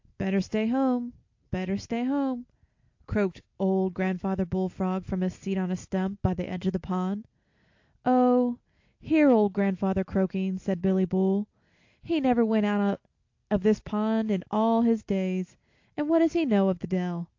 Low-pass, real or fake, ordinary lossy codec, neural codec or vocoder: 7.2 kHz; real; AAC, 48 kbps; none